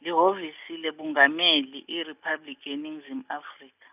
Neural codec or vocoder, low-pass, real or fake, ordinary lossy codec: none; 3.6 kHz; real; none